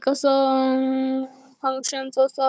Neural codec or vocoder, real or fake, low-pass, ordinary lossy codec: codec, 16 kHz, 4 kbps, FunCodec, trained on Chinese and English, 50 frames a second; fake; none; none